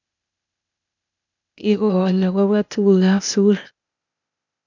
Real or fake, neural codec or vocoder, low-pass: fake; codec, 16 kHz, 0.8 kbps, ZipCodec; 7.2 kHz